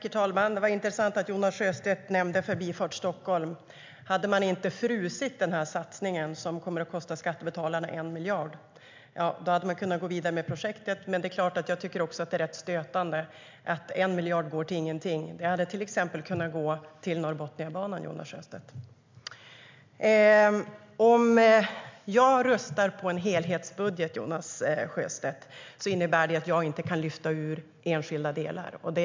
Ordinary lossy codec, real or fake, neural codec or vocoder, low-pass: MP3, 64 kbps; real; none; 7.2 kHz